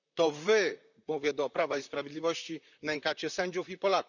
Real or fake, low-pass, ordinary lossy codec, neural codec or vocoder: fake; 7.2 kHz; none; vocoder, 44.1 kHz, 128 mel bands, Pupu-Vocoder